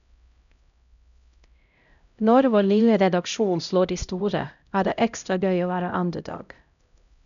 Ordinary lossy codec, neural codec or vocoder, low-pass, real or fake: none; codec, 16 kHz, 0.5 kbps, X-Codec, HuBERT features, trained on LibriSpeech; 7.2 kHz; fake